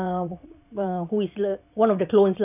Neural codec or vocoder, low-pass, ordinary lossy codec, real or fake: vocoder, 22.05 kHz, 80 mel bands, Vocos; 3.6 kHz; none; fake